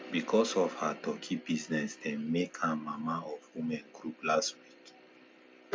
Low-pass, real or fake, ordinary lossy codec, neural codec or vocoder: none; real; none; none